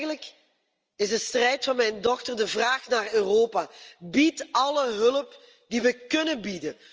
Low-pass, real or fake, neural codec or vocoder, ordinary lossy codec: 7.2 kHz; real; none; Opus, 24 kbps